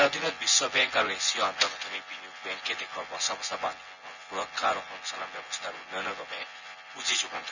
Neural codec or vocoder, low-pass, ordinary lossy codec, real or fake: vocoder, 24 kHz, 100 mel bands, Vocos; 7.2 kHz; none; fake